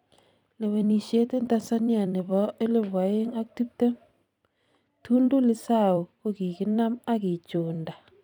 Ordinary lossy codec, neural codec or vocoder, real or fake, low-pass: none; vocoder, 44.1 kHz, 128 mel bands every 256 samples, BigVGAN v2; fake; 19.8 kHz